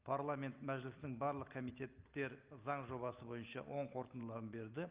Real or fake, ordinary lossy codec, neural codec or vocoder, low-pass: real; Opus, 24 kbps; none; 3.6 kHz